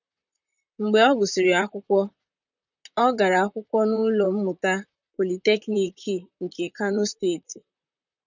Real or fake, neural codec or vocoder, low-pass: fake; vocoder, 44.1 kHz, 128 mel bands, Pupu-Vocoder; 7.2 kHz